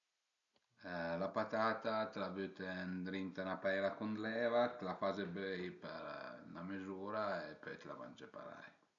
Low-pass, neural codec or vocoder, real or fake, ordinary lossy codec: 7.2 kHz; none; real; none